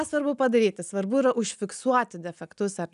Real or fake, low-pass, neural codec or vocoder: real; 10.8 kHz; none